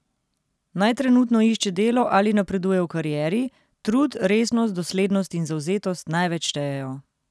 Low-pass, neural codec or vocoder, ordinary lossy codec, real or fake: none; none; none; real